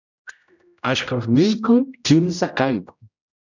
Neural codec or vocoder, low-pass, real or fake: codec, 16 kHz, 0.5 kbps, X-Codec, HuBERT features, trained on general audio; 7.2 kHz; fake